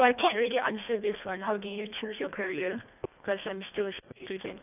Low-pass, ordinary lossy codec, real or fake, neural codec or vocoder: 3.6 kHz; none; fake; codec, 24 kHz, 1.5 kbps, HILCodec